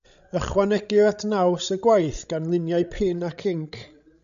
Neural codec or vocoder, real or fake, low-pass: codec, 16 kHz, 16 kbps, FreqCodec, larger model; fake; 7.2 kHz